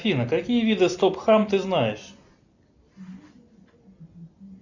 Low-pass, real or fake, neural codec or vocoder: 7.2 kHz; real; none